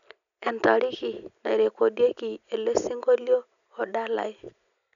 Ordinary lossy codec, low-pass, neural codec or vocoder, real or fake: none; 7.2 kHz; none; real